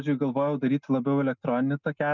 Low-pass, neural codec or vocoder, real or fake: 7.2 kHz; none; real